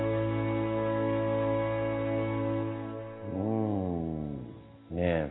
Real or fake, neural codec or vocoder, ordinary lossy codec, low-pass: real; none; AAC, 16 kbps; 7.2 kHz